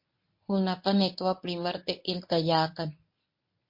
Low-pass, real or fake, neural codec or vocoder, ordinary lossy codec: 5.4 kHz; fake; codec, 24 kHz, 0.9 kbps, WavTokenizer, medium speech release version 1; MP3, 32 kbps